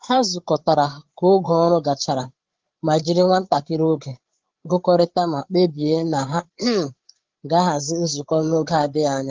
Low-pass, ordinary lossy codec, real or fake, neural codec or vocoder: 7.2 kHz; Opus, 16 kbps; fake; codec, 16 kHz, 8 kbps, FreqCodec, larger model